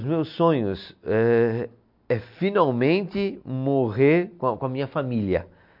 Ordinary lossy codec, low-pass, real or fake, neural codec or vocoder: MP3, 48 kbps; 5.4 kHz; real; none